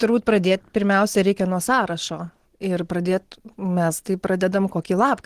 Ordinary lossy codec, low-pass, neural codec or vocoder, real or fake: Opus, 16 kbps; 14.4 kHz; none; real